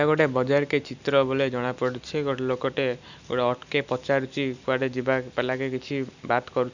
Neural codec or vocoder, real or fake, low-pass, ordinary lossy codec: none; real; 7.2 kHz; none